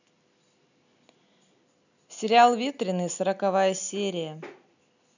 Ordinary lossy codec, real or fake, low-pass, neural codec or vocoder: none; real; 7.2 kHz; none